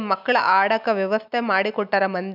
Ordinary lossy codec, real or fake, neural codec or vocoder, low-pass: none; real; none; 5.4 kHz